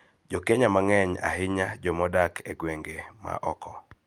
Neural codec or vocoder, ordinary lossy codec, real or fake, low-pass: none; Opus, 32 kbps; real; 19.8 kHz